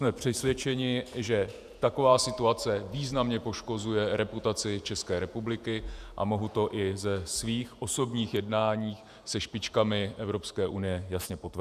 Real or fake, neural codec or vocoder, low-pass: fake; vocoder, 48 kHz, 128 mel bands, Vocos; 14.4 kHz